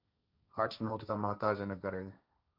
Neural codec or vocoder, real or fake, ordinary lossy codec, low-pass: codec, 16 kHz, 1.1 kbps, Voila-Tokenizer; fake; MP3, 48 kbps; 5.4 kHz